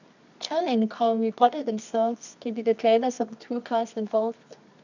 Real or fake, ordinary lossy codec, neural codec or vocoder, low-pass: fake; none; codec, 24 kHz, 0.9 kbps, WavTokenizer, medium music audio release; 7.2 kHz